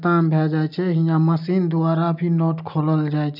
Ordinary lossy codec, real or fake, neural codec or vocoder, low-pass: none; real; none; 5.4 kHz